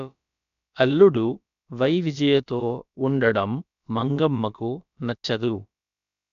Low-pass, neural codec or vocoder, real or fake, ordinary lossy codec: 7.2 kHz; codec, 16 kHz, about 1 kbps, DyCAST, with the encoder's durations; fake; AAC, 96 kbps